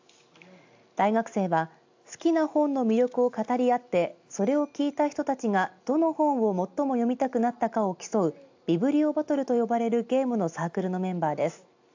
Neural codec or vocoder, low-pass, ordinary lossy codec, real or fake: none; 7.2 kHz; AAC, 48 kbps; real